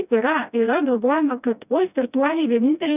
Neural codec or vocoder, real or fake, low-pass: codec, 16 kHz, 1 kbps, FreqCodec, smaller model; fake; 3.6 kHz